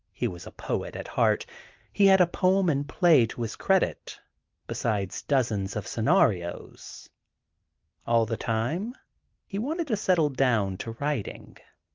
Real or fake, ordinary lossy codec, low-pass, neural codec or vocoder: real; Opus, 32 kbps; 7.2 kHz; none